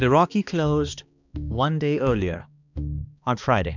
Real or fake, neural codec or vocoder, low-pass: fake; codec, 16 kHz, 2 kbps, X-Codec, HuBERT features, trained on balanced general audio; 7.2 kHz